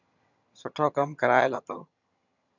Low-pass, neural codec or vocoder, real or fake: 7.2 kHz; vocoder, 22.05 kHz, 80 mel bands, HiFi-GAN; fake